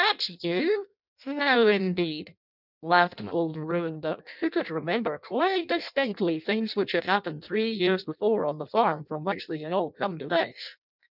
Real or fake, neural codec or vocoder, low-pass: fake; codec, 16 kHz in and 24 kHz out, 0.6 kbps, FireRedTTS-2 codec; 5.4 kHz